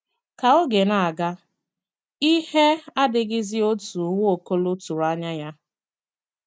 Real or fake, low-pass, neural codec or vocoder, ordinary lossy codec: real; none; none; none